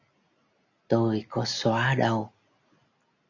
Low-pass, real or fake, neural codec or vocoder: 7.2 kHz; real; none